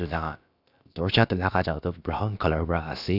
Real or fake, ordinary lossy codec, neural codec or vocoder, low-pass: fake; none; codec, 16 kHz, about 1 kbps, DyCAST, with the encoder's durations; 5.4 kHz